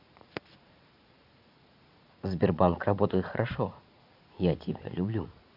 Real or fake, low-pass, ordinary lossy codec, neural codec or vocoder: fake; 5.4 kHz; none; vocoder, 44.1 kHz, 80 mel bands, Vocos